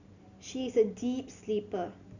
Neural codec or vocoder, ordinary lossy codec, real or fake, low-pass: none; none; real; 7.2 kHz